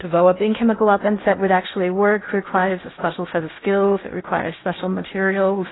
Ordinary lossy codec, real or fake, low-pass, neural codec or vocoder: AAC, 16 kbps; fake; 7.2 kHz; codec, 16 kHz in and 24 kHz out, 0.6 kbps, FocalCodec, streaming, 2048 codes